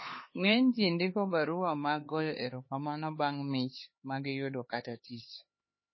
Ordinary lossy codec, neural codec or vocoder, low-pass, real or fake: MP3, 24 kbps; codec, 16 kHz, 4 kbps, X-Codec, HuBERT features, trained on LibriSpeech; 7.2 kHz; fake